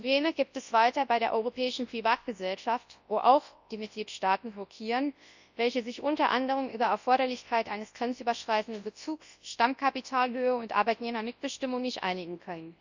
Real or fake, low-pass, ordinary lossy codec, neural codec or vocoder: fake; 7.2 kHz; Opus, 64 kbps; codec, 24 kHz, 0.9 kbps, WavTokenizer, large speech release